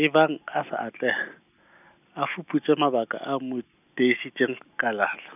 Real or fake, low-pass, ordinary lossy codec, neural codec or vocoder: real; 3.6 kHz; none; none